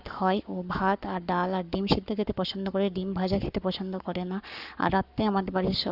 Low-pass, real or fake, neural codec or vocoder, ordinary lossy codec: 5.4 kHz; fake; codec, 44.1 kHz, 7.8 kbps, Pupu-Codec; none